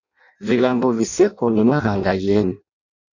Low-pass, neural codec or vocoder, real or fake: 7.2 kHz; codec, 16 kHz in and 24 kHz out, 0.6 kbps, FireRedTTS-2 codec; fake